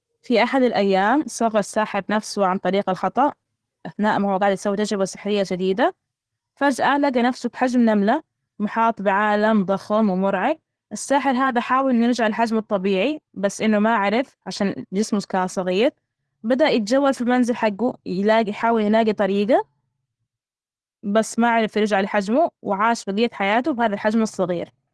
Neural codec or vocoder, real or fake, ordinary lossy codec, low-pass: none; real; Opus, 16 kbps; 10.8 kHz